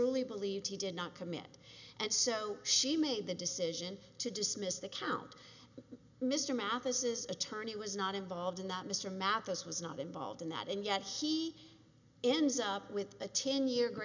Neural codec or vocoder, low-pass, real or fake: none; 7.2 kHz; real